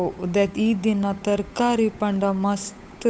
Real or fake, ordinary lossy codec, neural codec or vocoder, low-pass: fake; none; codec, 16 kHz, 8 kbps, FunCodec, trained on Chinese and English, 25 frames a second; none